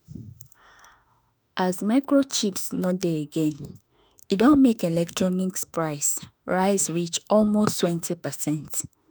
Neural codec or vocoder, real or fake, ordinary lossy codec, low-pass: autoencoder, 48 kHz, 32 numbers a frame, DAC-VAE, trained on Japanese speech; fake; none; none